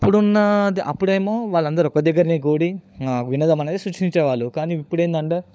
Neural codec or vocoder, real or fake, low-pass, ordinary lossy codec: codec, 16 kHz, 16 kbps, FunCodec, trained on LibriTTS, 50 frames a second; fake; none; none